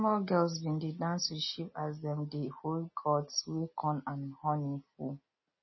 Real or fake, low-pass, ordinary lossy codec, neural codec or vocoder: real; 7.2 kHz; MP3, 24 kbps; none